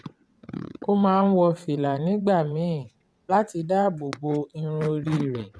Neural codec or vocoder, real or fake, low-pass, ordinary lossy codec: vocoder, 22.05 kHz, 80 mel bands, WaveNeXt; fake; none; none